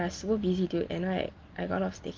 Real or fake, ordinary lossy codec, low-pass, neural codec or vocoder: real; Opus, 32 kbps; 7.2 kHz; none